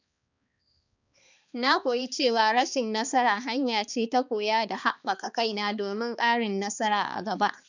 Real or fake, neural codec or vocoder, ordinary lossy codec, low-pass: fake; codec, 16 kHz, 2 kbps, X-Codec, HuBERT features, trained on balanced general audio; none; 7.2 kHz